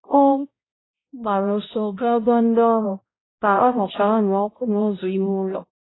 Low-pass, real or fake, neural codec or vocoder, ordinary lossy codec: 7.2 kHz; fake; codec, 16 kHz, 0.5 kbps, X-Codec, HuBERT features, trained on balanced general audio; AAC, 16 kbps